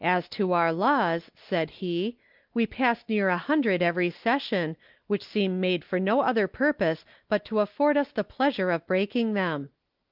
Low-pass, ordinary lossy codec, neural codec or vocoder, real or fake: 5.4 kHz; Opus, 24 kbps; none; real